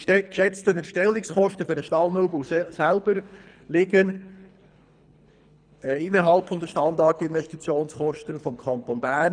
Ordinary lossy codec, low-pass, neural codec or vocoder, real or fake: none; 9.9 kHz; codec, 24 kHz, 3 kbps, HILCodec; fake